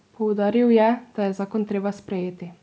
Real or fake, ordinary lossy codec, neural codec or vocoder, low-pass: real; none; none; none